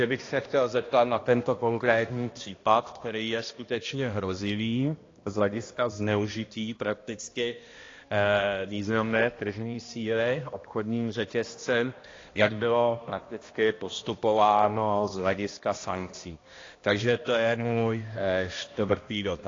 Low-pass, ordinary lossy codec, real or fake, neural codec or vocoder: 7.2 kHz; AAC, 32 kbps; fake; codec, 16 kHz, 1 kbps, X-Codec, HuBERT features, trained on balanced general audio